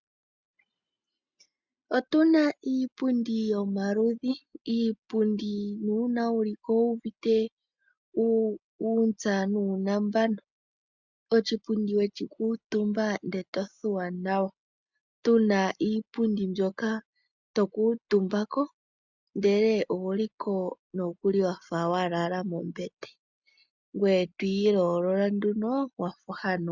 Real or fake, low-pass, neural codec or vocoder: real; 7.2 kHz; none